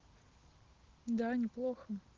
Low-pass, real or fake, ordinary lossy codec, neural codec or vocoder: 7.2 kHz; real; Opus, 16 kbps; none